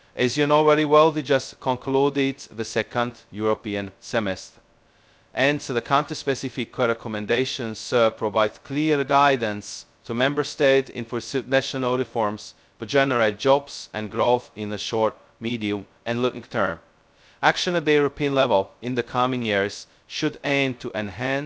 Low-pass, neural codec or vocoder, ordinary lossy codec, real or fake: none; codec, 16 kHz, 0.2 kbps, FocalCodec; none; fake